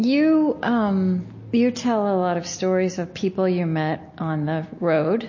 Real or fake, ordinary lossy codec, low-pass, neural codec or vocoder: real; MP3, 32 kbps; 7.2 kHz; none